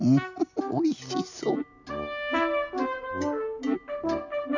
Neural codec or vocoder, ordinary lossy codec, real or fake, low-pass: none; none; real; 7.2 kHz